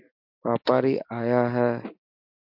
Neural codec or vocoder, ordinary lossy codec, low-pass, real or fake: none; MP3, 48 kbps; 5.4 kHz; real